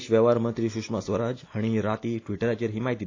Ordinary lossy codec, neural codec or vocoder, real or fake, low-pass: AAC, 32 kbps; none; real; 7.2 kHz